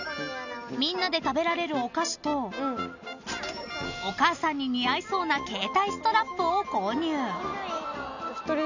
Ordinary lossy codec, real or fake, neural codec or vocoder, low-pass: none; real; none; 7.2 kHz